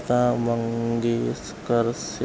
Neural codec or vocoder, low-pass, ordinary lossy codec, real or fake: none; none; none; real